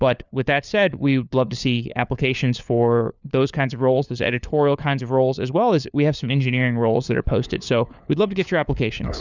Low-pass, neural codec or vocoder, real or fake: 7.2 kHz; codec, 16 kHz, 4 kbps, FunCodec, trained on LibriTTS, 50 frames a second; fake